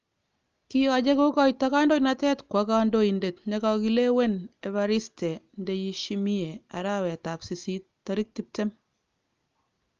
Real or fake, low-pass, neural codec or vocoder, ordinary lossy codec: real; 7.2 kHz; none; Opus, 32 kbps